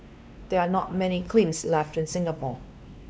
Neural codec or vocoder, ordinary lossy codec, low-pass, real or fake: codec, 16 kHz, 2 kbps, X-Codec, WavLM features, trained on Multilingual LibriSpeech; none; none; fake